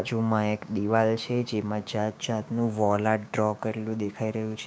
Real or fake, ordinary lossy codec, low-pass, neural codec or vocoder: fake; none; none; codec, 16 kHz, 6 kbps, DAC